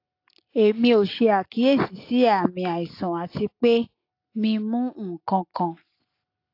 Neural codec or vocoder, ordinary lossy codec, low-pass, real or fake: none; AAC, 32 kbps; 5.4 kHz; real